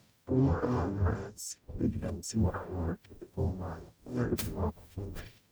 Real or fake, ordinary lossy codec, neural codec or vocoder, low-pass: fake; none; codec, 44.1 kHz, 0.9 kbps, DAC; none